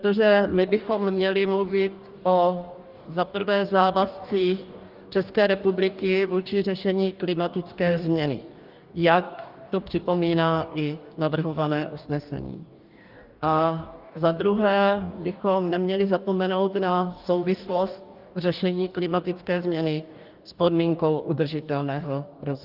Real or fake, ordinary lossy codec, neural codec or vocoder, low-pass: fake; Opus, 24 kbps; codec, 44.1 kHz, 2.6 kbps, DAC; 5.4 kHz